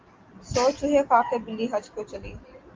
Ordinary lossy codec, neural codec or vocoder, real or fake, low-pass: Opus, 32 kbps; none; real; 7.2 kHz